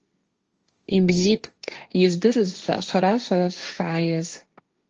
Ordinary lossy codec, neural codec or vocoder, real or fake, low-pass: Opus, 24 kbps; codec, 16 kHz, 1.1 kbps, Voila-Tokenizer; fake; 7.2 kHz